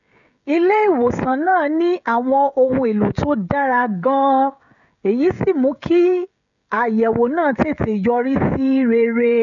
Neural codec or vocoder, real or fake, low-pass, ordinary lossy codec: codec, 16 kHz, 16 kbps, FreqCodec, smaller model; fake; 7.2 kHz; none